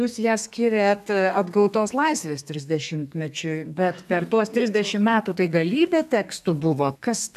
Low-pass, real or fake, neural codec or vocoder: 14.4 kHz; fake; codec, 32 kHz, 1.9 kbps, SNAC